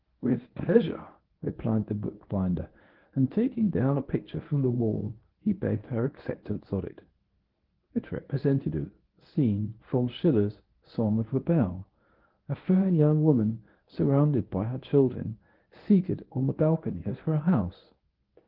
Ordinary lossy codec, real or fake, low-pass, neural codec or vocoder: Opus, 16 kbps; fake; 5.4 kHz; codec, 24 kHz, 0.9 kbps, WavTokenizer, medium speech release version 1